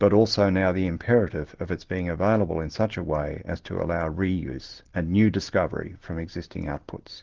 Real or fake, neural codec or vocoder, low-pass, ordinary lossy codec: real; none; 7.2 kHz; Opus, 16 kbps